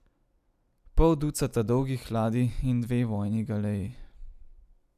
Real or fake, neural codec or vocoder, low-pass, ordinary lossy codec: real; none; 14.4 kHz; none